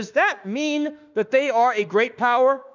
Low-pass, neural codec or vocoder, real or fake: 7.2 kHz; autoencoder, 48 kHz, 32 numbers a frame, DAC-VAE, trained on Japanese speech; fake